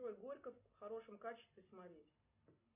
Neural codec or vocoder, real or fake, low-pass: none; real; 3.6 kHz